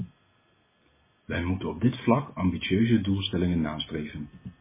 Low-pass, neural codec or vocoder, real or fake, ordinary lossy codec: 3.6 kHz; none; real; MP3, 16 kbps